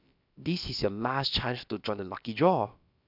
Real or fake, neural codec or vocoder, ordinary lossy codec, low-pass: fake; codec, 16 kHz, about 1 kbps, DyCAST, with the encoder's durations; none; 5.4 kHz